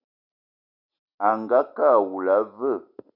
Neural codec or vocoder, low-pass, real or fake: none; 5.4 kHz; real